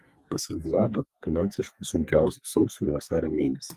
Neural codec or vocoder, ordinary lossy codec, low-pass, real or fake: codec, 32 kHz, 1.9 kbps, SNAC; Opus, 32 kbps; 14.4 kHz; fake